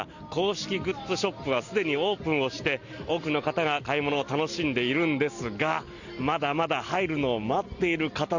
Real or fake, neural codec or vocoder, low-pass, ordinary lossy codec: real; none; 7.2 kHz; none